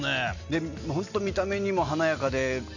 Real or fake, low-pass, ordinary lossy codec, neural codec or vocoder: real; 7.2 kHz; none; none